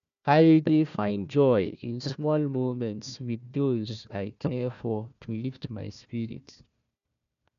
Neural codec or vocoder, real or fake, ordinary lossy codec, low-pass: codec, 16 kHz, 1 kbps, FunCodec, trained on Chinese and English, 50 frames a second; fake; none; 7.2 kHz